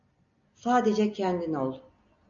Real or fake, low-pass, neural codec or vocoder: real; 7.2 kHz; none